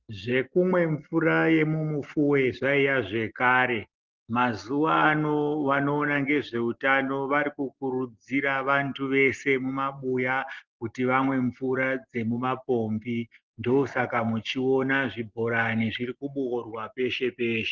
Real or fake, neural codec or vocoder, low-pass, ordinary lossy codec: real; none; 7.2 kHz; Opus, 16 kbps